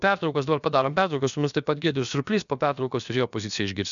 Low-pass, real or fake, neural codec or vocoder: 7.2 kHz; fake; codec, 16 kHz, about 1 kbps, DyCAST, with the encoder's durations